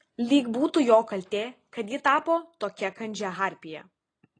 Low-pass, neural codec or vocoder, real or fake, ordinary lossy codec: 9.9 kHz; none; real; AAC, 32 kbps